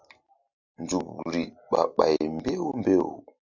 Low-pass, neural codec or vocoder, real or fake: 7.2 kHz; none; real